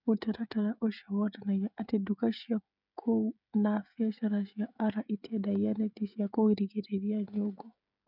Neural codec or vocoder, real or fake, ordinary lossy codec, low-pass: codec, 16 kHz, 16 kbps, FreqCodec, smaller model; fake; none; 5.4 kHz